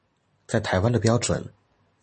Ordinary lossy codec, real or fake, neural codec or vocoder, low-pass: MP3, 32 kbps; real; none; 10.8 kHz